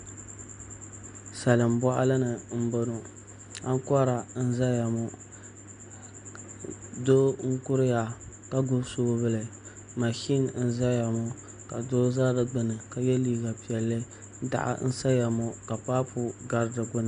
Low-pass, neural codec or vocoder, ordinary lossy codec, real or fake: 9.9 kHz; none; MP3, 64 kbps; real